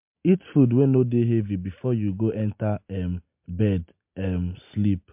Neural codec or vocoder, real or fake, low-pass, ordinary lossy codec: none; real; 3.6 kHz; MP3, 32 kbps